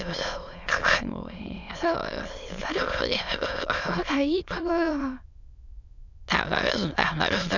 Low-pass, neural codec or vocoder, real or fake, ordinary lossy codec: 7.2 kHz; autoencoder, 22.05 kHz, a latent of 192 numbers a frame, VITS, trained on many speakers; fake; none